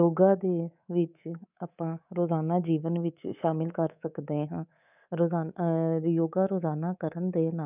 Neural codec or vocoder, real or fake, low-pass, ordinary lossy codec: codec, 24 kHz, 3.1 kbps, DualCodec; fake; 3.6 kHz; none